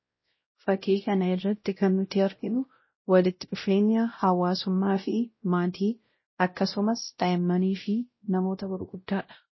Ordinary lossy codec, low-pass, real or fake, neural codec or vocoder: MP3, 24 kbps; 7.2 kHz; fake; codec, 16 kHz, 0.5 kbps, X-Codec, WavLM features, trained on Multilingual LibriSpeech